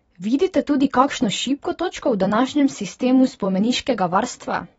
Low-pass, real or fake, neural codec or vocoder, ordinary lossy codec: 19.8 kHz; fake; vocoder, 44.1 kHz, 128 mel bands every 256 samples, BigVGAN v2; AAC, 24 kbps